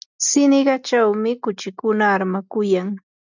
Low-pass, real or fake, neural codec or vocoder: 7.2 kHz; real; none